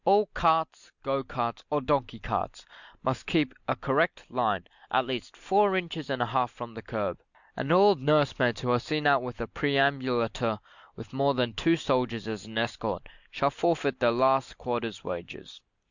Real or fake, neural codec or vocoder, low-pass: real; none; 7.2 kHz